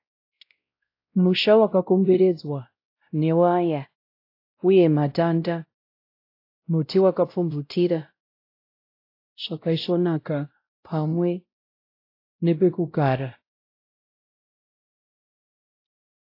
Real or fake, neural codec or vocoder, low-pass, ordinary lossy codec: fake; codec, 16 kHz, 0.5 kbps, X-Codec, WavLM features, trained on Multilingual LibriSpeech; 5.4 kHz; AAC, 32 kbps